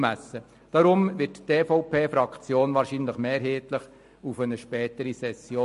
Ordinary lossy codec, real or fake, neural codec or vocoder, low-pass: MP3, 48 kbps; real; none; 14.4 kHz